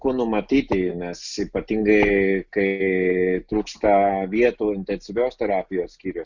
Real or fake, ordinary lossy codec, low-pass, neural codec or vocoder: real; Opus, 64 kbps; 7.2 kHz; none